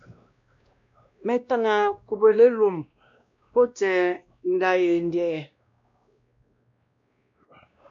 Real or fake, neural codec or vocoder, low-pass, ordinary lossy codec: fake; codec, 16 kHz, 1 kbps, X-Codec, WavLM features, trained on Multilingual LibriSpeech; 7.2 kHz; MP3, 64 kbps